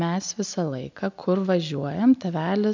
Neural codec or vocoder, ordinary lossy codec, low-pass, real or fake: none; MP3, 64 kbps; 7.2 kHz; real